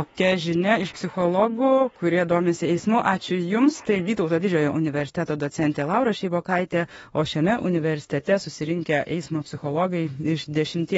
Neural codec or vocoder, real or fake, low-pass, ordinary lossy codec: autoencoder, 48 kHz, 32 numbers a frame, DAC-VAE, trained on Japanese speech; fake; 19.8 kHz; AAC, 24 kbps